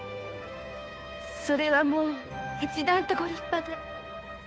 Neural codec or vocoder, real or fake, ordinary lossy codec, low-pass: codec, 16 kHz, 2 kbps, FunCodec, trained on Chinese and English, 25 frames a second; fake; none; none